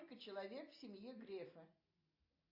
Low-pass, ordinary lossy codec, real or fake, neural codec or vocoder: 5.4 kHz; AAC, 32 kbps; real; none